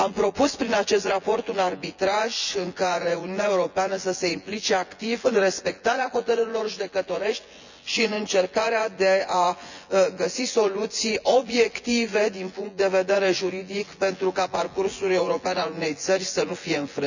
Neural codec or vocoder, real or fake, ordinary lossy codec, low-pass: vocoder, 24 kHz, 100 mel bands, Vocos; fake; none; 7.2 kHz